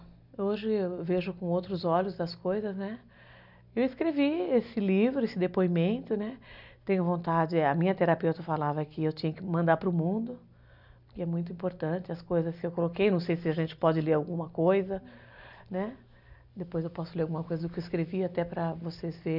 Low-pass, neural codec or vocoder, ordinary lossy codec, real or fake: 5.4 kHz; none; none; real